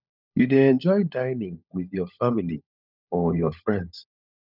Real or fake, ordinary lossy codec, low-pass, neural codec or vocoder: fake; none; 5.4 kHz; codec, 16 kHz, 16 kbps, FunCodec, trained on LibriTTS, 50 frames a second